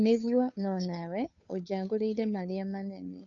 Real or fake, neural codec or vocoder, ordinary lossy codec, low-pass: fake; codec, 16 kHz, 2 kbps, FunCodec, trained on Chinese and English, 25 frames a second; none; 7.2 kHz